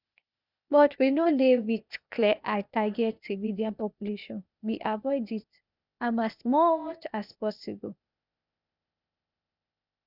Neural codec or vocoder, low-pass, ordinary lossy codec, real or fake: codec, 16 kHz, 0.8 kbps, ZipCodec; 5.4 kHz; none; fake